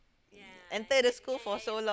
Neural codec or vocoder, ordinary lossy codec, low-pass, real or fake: none; none; none; real